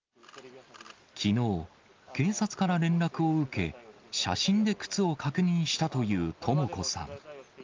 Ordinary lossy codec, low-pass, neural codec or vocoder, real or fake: Opus, 32 kbps; 7.2 kHz; none; real